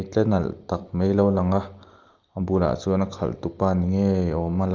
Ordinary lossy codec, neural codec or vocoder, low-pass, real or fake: Opus, 32 kbps; none; 7.2 kHz; real